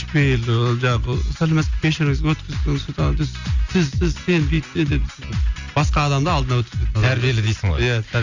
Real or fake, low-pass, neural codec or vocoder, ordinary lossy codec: real; 7.2 kHz; none; Opus, 64 kbps